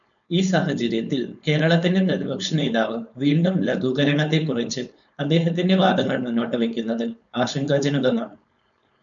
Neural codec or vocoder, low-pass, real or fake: codec, 16 kHz, 4.8 kbps, FACodec; 7.2 kHz; fake